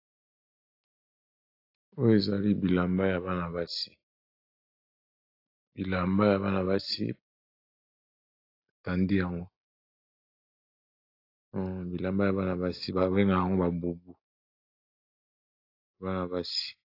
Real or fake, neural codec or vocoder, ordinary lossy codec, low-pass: real; none; AAC, 32 kbps; 5.4 kHz